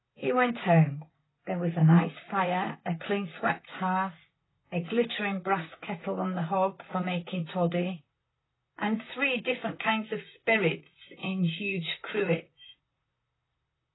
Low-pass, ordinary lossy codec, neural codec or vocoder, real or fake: 7.2 kHz; AAC, 16 kbps; vocoder, 44.1 kHz, 128 mel bands, Pupu-Vocoder; fake